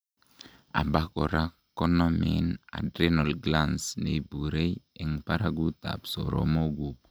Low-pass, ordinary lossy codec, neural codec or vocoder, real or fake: none; none; none; real